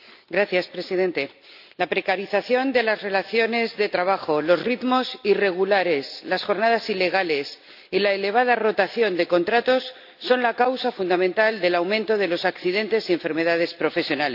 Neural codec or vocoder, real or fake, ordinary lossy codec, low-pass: none; real; AAC, 48 kbps; 5.4 kHz